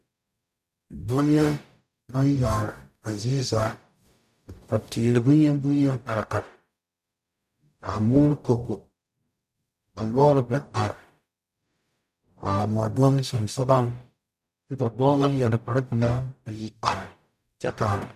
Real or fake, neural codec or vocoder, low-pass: fake; codec, 44.1 kHz, 0.9 kbps, DAC; 14.4 kHz